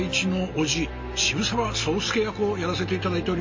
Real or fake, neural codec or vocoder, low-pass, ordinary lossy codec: real; none; 7.2 kHz; MP3, 32 kbps